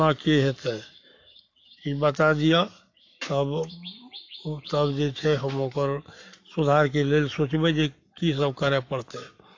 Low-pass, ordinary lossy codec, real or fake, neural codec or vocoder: 7.2 kHz; AAC, 48 kbps; fake; codec, 44.1 kHz, 7.8 kbps, Pupu-Codec